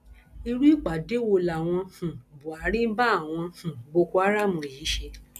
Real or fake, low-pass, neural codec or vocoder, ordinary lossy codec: real; 14.4 kHz; none; none